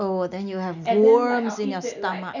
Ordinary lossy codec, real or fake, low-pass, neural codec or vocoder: none; real; 7.2 kHz; none